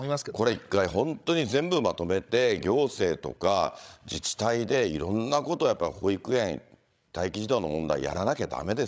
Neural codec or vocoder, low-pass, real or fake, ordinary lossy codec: codec, 16 kHz, 16 kbps, FreqCodec, larger model; none; fake; none